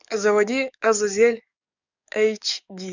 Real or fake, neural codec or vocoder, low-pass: fake; codec, 44.1 kHz, 7.8 kbps, DAC; 7.2 kHz